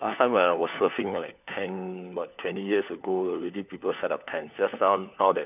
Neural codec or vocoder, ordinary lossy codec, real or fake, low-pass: codec, 16 kHz, 4 kbps, FunCodec, trained on LibriTTS, 50 frames a second; none; fake; 3.6 kHz